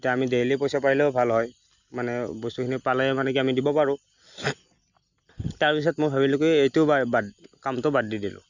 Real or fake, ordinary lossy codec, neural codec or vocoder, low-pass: real; none; none; 7.2 kHz